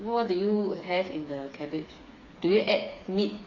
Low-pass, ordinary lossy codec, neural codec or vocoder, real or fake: 7.2 kHz; AAC, 32 kbps; codec, 16 kHz, 4 kbps, FreqCodec, smaller model; fake